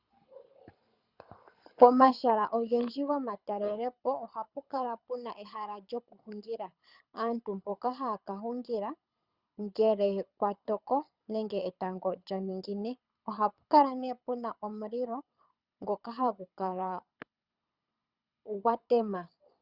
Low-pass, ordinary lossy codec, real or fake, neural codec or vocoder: 5.4 kHz; Opus, 64 kbps; fake; codec, 24 kHz, 6 kbps, HILCodec